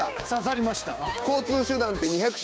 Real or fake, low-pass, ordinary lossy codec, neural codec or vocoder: fake; none; none; codec, 16 kHz, 6 kbps, DAC